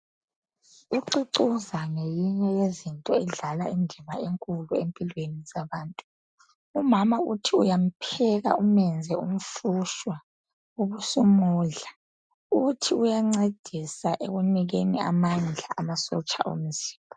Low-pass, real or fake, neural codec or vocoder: 9.9 kHz; real; none